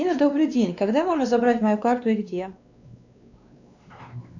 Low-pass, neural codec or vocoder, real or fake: 7.2 kHz; codec, 16 kHz, 2 kbps, X-Codec, WavLM features, trained on Multilingual LibriSpeech; fake